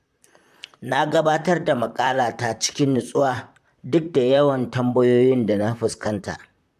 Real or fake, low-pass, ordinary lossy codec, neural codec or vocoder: fake; 14.4 kHz; AAC, 96 kbps; vocoder, 44.1 kHz, 128 mel bands, Pupu-Vocoder